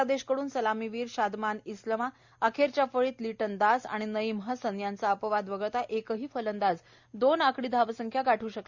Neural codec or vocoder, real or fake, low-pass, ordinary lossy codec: none; real; 7.2 kHz; Opus, 64 kbps